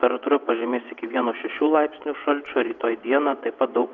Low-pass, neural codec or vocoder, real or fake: 7.2 kHz; vocoder, 22.05 kHz, 80 mel bands, WaveNeXt; fake